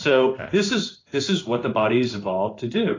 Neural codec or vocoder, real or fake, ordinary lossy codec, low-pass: codec, 16 kHz in and 24 kHz out, 1 kbps, XY-Tokenizer; fake; AAC, 32 kbps; 7.2 kHz